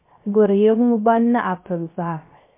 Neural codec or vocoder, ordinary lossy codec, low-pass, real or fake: codec, 16 kHz, 0.3 kbps, FocalCodec; MP3, 24 kbps; 3.6 kHz; fake